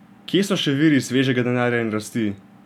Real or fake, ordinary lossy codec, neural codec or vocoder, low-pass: real; none; none; 19.8 kHz